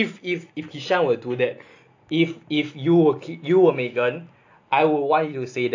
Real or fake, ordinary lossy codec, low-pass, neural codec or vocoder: real; AAC, 48 kbps; 7.2 kHz; none